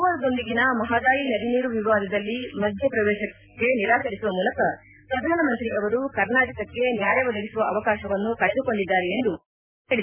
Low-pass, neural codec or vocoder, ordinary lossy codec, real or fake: 3.6 kHz; none; none; real